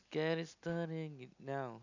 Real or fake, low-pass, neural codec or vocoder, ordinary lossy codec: real; 7.2 kHz; none; none